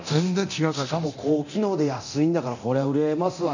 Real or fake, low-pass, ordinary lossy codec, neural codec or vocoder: fake; 7.2 kHz; none; codec, 24 kHz, 0.9 kbps, DualCodec